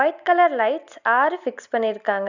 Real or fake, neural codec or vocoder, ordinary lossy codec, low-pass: real; none; none; 7.2 kHz